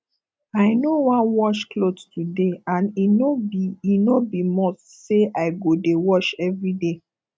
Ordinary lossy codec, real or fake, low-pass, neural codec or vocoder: none; real; none; none